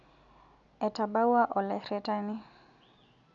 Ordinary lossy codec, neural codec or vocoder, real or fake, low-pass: MP3, 96 kbps; none; real; 7.2 kHz